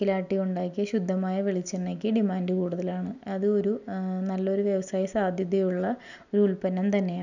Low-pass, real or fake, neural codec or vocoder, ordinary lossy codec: 7.2 kHz; real; none; none